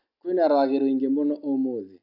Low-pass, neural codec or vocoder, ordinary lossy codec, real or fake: 5.4 kHz; none; none; real